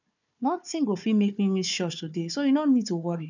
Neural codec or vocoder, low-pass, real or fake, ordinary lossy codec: codec, 16 kHz, 4 kbps, FunCodec, trained on Chinese and English, 50 frames a second; 7.2 kHz; fake; none